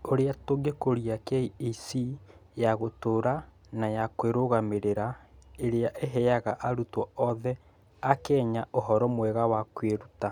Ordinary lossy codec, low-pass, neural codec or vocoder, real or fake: none; 19.8 kHz; none; real